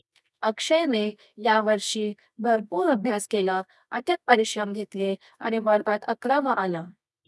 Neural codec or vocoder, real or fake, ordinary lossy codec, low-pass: codec, 24 kHz, 0.9 kbps, WavTokenizer, medium music audio release; fake; none; none